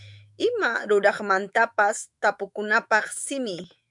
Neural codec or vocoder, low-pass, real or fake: autoencoder, 48 kHz, 128 numbers a frame, DAC-VAE, trained on Japanese speech; 10.8 kHz; fake